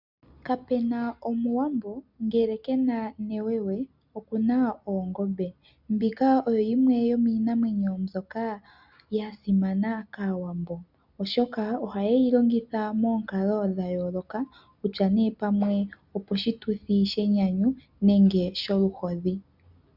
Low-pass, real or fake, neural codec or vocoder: 5.4 kHz; real; none